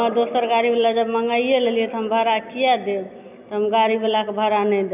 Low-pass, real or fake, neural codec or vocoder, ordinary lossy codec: 3.6 kHz; real; none; none